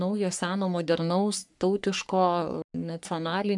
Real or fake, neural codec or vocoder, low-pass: fake; codec, 44.1 kHz, 3.4 kbps, Pupu-Codec; 10.8 kHz